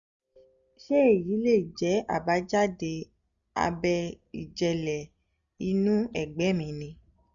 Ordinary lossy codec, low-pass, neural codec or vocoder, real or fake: none; 7.2 kHz; none; real